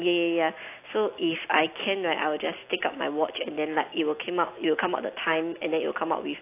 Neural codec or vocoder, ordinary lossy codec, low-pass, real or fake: none; AAC, 24 kbps; 3.6 kHz; real